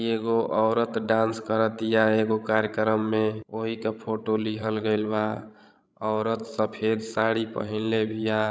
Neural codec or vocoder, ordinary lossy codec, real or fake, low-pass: codec, 16 kHz, 16 kbps, FreqCodec, larger model; none; fake; none